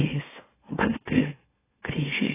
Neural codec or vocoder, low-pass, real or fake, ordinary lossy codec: autoencoder, 44.1 kHz, a latent of 192 numbers a frame, MeloTTS; 3.6 kHz; fake; AAC, 16 kbps